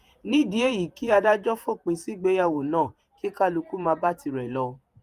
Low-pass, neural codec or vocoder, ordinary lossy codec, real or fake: 14.4 kHz; vocoder, 48 kHz, 128 mel bands, Vocos; Opus, 32 kbps; fake